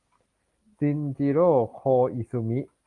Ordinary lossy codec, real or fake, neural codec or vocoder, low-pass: Opus, 32 kbps; real; none; 10.8 kHz